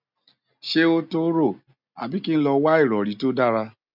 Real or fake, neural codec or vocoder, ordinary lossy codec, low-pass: real; none; none; 5.4 kHz